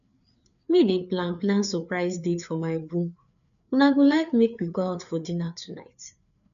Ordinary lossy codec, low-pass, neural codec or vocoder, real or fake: none; 7.2 kHz; codec, 16 kHz, 4 kbps, FreqCodec, larger model; fake